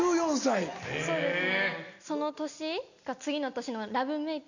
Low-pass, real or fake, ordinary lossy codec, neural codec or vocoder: 7.2 kHz; real; none; none